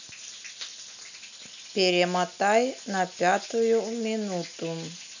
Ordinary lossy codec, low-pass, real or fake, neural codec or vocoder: none; 7.2 kHz; real; none